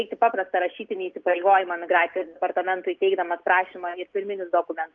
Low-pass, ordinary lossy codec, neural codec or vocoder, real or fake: 7.2 kHz; Opus, 32 kbps; none; real